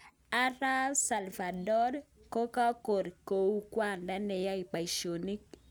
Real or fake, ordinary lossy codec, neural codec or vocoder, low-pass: real; none; none; none